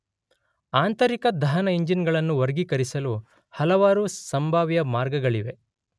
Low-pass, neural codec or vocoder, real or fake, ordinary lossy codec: none; none; real; none